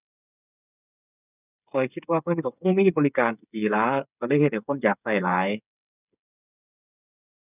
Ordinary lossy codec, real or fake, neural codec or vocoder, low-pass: none; fake; codec, 16 kHz, 8 kbps, FreqCodec, smaller model; 3.6 kHz